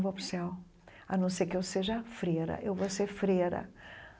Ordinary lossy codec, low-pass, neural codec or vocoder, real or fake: none; none; none; real